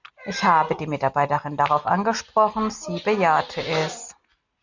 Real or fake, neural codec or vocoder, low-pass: real; none; 7.2 kHz